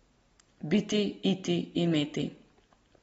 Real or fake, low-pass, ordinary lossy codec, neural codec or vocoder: real; 19.8 kHz; AAC, 24 kbps; none